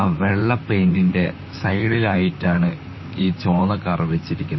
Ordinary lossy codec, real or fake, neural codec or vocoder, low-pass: MP3, 24 kbps; fake; vocoder, 44.1 kHz, 128 mel bands, Pupu-Vocoder; 7.2 kHz